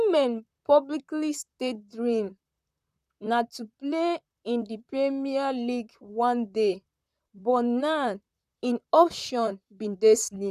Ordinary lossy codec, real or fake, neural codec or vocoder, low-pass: none; fake; vocoder, 44.1 kHz, 128 mel bands, Pupu-Vocoder; 14.4 kHz